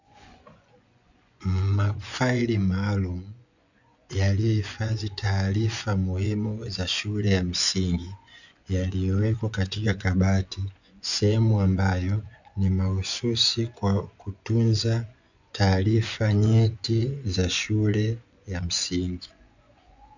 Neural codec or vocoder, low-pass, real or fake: vocoder, 24 kHz, 100 mel bands, Vocos; 7.2 kHz; fake